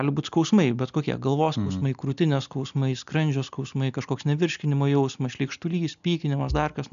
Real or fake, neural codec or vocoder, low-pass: real; none; 7.2 kHz